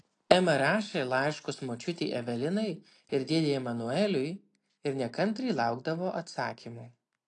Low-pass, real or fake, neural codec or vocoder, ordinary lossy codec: 9.9 kHz; real; none; AAC, 48 kbps